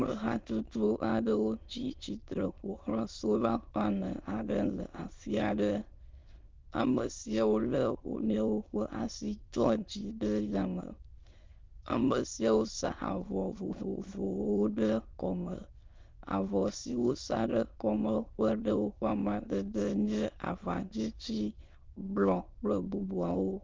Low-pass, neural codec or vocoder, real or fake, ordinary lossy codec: 7.2 kHz; autoencoder, 22.05 kHz, a latent of 192 numbers a frame, VITS, trained on many speakers; fake; Opus, 32 kbps